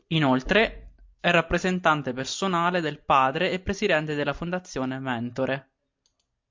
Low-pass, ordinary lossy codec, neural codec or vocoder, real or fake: 7.2 kHz; MP3, 48 kbps; none; real